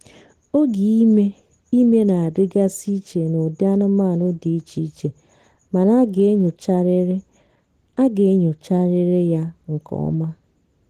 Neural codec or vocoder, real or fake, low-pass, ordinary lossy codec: none; real; 14.4 kHz; Opus, 16 kbps